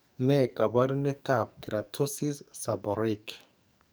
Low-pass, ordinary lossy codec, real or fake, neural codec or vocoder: none; none; fake; codec, 44.1 kHz, 2.6 kbps, SNAC